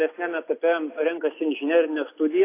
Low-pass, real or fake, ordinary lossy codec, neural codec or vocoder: 3.6 kHz; real; AAC, 24 kbps; none